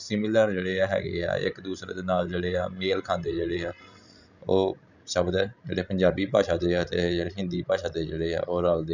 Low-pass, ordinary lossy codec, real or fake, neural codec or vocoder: 7.2 kHz; none; fake; codec, 16 kHz, 16 kbps, FreqCodec, larger model